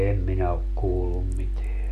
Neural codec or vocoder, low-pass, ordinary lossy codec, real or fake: none; 14.4 kHz; none; real